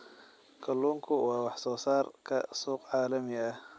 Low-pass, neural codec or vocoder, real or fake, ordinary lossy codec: none; none; real; none